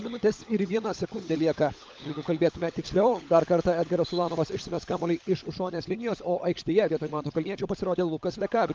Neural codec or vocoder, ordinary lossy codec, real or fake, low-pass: codec, 16 kHz, 16 kbps, FunCodec, trained on LibriTTS, 50 frames a second; Opus, 24 kbps; fake; 7.2 kHz